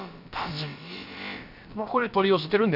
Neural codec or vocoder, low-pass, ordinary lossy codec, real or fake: codec, 16 kHz, about 1 kbps, DyCAST, with the encoder's durations; 5.4 kHz; none; fake